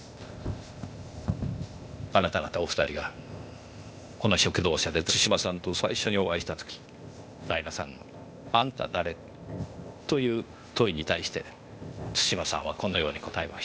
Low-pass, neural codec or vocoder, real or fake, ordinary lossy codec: none; codec, 16 kHz, 0.8 kbps, ZipCodec; fake; none